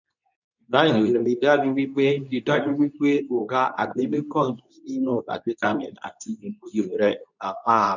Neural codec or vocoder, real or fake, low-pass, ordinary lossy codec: codec, 24 kHz, 0.9 kbps, WavTokenizer, medium speech release version 2; fake; 7.2 kHz; none